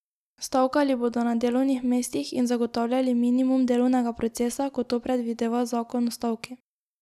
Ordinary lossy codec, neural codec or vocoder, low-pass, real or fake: none; none; 14.4 kHz; real